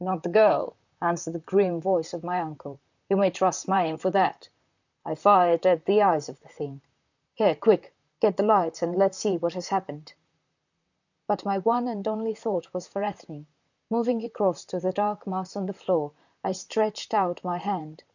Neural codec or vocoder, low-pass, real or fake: vocoder, 44.1 kHz, 128 mel bands, Pupu-Vocoder; 7.2 kHz; fake